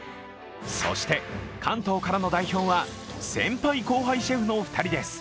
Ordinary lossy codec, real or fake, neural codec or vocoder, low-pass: none; real; none; none